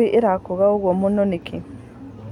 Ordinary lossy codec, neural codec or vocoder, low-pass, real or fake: none; none; 19.8 kHz; real